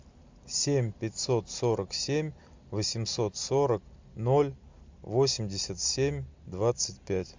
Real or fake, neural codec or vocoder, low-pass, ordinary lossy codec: real; none; 7.2 kHz; MP3, 64 kbps